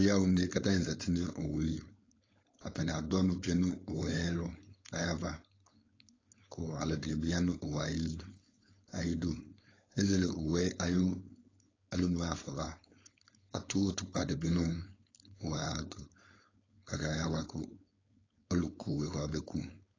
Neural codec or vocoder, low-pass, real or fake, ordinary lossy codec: codec, 16 kHz, 4.8 kbps, FACodec; 7.2 kHz; fake; AAC, 32 kbps